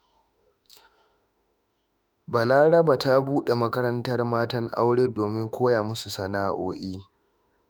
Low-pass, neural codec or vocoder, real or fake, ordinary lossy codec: none; autoencoder, 48 kHz, 32 numbers a frame, DAC-VAE, trained on Japanese speech; fake; none